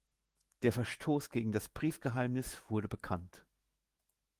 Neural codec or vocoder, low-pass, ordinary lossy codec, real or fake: autoencoder, 48 kHz, 128 numbers a frame, DAC-VAE, trained on Japanese speech; 14.4 kHz; Opus, 24 kbps; fake